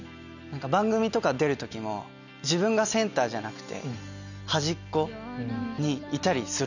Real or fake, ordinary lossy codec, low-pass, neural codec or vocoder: real; none; 7.2 kHz; none